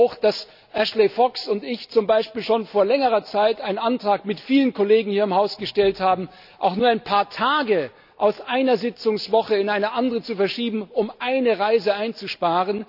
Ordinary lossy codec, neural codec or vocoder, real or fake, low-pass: none; none; real; 5.4 kHz